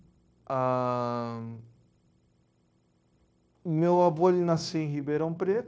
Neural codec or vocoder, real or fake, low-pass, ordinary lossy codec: codec, 16 kHz, 0.9 kbps, LongCat-Audio-Codec; fake; none; none